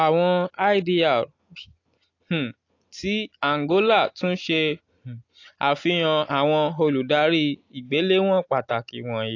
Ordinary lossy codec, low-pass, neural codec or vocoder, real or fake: AAC, 48 kbps; 7.2 kHz; none; real